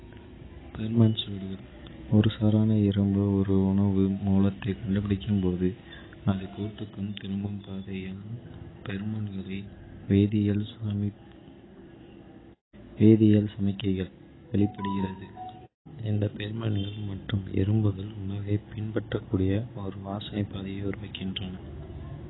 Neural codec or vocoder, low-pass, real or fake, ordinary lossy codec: none; 7.2 kHz; real; AAC, 16 kbps